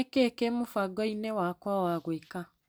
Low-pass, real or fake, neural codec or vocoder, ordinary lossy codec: none; real; none; none